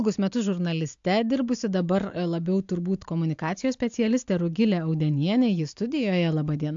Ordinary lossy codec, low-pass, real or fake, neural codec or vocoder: AAC, 64 kbps; 7.2 kHz; real; none